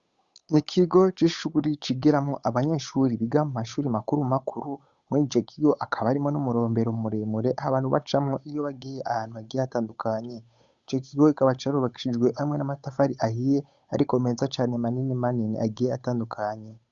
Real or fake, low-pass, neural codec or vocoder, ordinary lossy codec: fake; 7.2 kHz; codec, 16 kHz, 8 kbps, FunCodec, trained on Chinese and English, 25 frames a second; Opus, 64 kbps